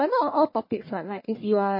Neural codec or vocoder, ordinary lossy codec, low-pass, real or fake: codec, 44.1 kHz, 1.7 kbps, Pupu-Codec; MP3, 24 kbps; 5.4 kHz; fake